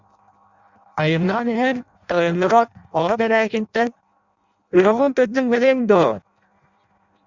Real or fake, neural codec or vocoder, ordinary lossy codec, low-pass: fake; codec, 16 kHz in and 24 kHz out, 0.6 kbps, FireRedTTS-2 codec; Opus, 64 kbps; 7.2 kHz